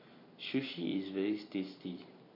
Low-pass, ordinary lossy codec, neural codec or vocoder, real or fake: 5.4 kHz; MP3, 32 kbps; none; real